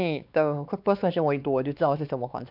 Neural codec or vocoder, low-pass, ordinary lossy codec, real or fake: codec, 16 kHz, 2 kbps, X-Codec, WavLM features, trained on Multilingual LibriSpeech; 5.4 kHz; none; fake